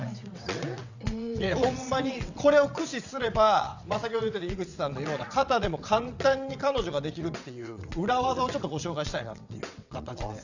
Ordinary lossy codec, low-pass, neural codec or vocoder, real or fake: none; 7.2 kHz; vocoder, 22.05 kHz, 80 mel bands, WaveNeXt; fake